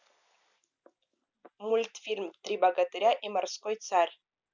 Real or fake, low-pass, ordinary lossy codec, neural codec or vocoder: real; 7.2 kHz; none; none